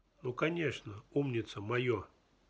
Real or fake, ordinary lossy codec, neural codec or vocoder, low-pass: real; none; none; none